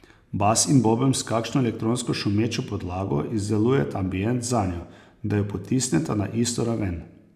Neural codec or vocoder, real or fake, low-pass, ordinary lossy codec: none; real; 14.4 kHz; none